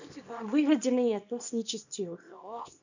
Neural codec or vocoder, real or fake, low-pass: codec, 24 kHz, 0.9 kbps, WavTokenizer, small release; fake; 7.2 kHz